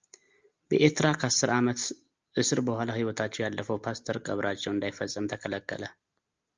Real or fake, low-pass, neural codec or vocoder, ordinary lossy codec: real; 7.2 kHz; none; Opus, 24 kbps